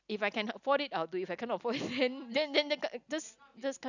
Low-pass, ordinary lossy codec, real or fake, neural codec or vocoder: 7.2 kHz; none; real; none